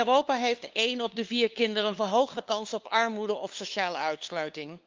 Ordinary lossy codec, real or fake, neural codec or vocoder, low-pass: Opus, 24 kbps; fake; codec, 16 kHz, 2 kbps, FunCodec, trained on LibriTTS, 25 frames a second; 7.2 kHz